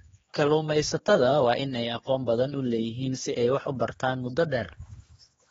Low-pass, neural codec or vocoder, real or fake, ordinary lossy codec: 7.2 kHz; codec, 16 kHz, 2 kbps, X-Codec, HuBERT features, trained on general audio; fake; AAC, 24 kbps